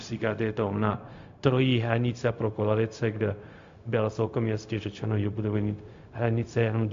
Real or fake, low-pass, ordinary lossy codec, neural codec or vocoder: fake; 7.2 kHz; AAC, 64 kbps; codec, 16 kHz, 0.4 kbps, LongCat-Audio-Codec